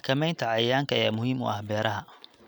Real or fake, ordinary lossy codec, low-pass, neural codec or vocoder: real; none; none; none